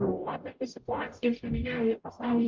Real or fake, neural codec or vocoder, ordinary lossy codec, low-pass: fake; codec, 44.1 kHz, 0.9 kbps, DAC; Opus, 24 kbps; 7.2 kHz